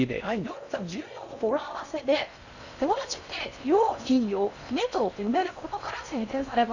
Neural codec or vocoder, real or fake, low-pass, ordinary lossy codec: codec, 16 kHz in and 24 kHz out, 0.6 kbps, FocalCodec, streaming, 4096 codes; fake; 7.2 kHz; none